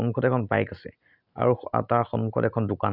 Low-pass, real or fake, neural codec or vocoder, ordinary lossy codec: 5.4 kHz; real; none; none